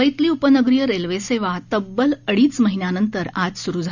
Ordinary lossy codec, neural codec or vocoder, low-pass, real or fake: none; none; 7.2 kHz; real